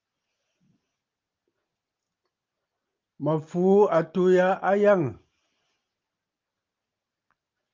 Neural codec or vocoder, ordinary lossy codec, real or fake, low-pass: none; Opus, 32 kbps; real; 7.2 kHz